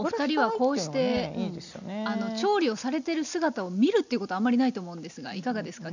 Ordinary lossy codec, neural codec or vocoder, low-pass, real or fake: none; none; 7.2 kHz; real